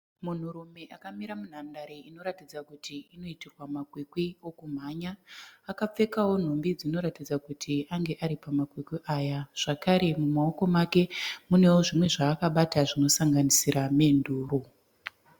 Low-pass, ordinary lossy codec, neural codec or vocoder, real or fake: 19.8 kHz; Opus, 64 kbps; none; real